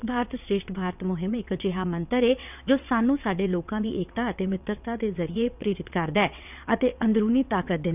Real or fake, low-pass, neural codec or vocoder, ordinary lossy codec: fake; 3.6 kHz; vocoder, 22.05 kHz, 80 mel bands, WaveNeXt; none